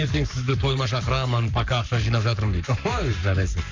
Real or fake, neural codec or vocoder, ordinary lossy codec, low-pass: fake; codec, 44.1 kHz, 7.8 kbps, Pupu-Codec; MP3, 64 kbps; 7.2 kHz